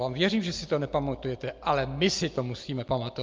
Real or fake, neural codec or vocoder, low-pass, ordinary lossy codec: real; none; 7.2 kHz; Opus, 24 kbps